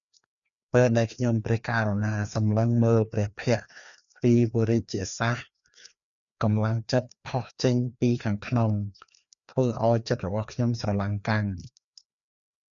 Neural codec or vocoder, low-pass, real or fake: codec, 16 kHz, 2 kbps, FreqCodec, larger model; 7.2 kHz; fake